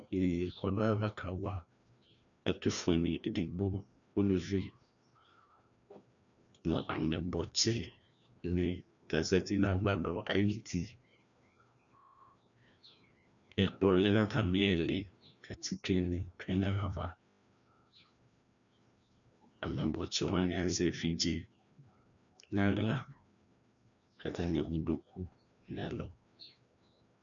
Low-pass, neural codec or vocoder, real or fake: 7.2 kHz; codec, 16 kHz, 1 kbps, FreqCodec, larger model; fake